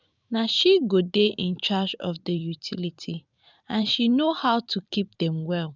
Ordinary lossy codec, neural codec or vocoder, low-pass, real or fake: none; vocoder, 44.1 kHz, 128 mel bands every 512 samples, BigVGAN v2; 7.2 kHz; fake